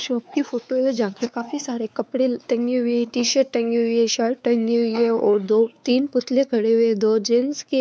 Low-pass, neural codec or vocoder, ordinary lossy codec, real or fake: none; codec, 16 kHz, 4 kbps, X-Codec, WavLM features, trained on Multilingual LibriSpeech; none; fake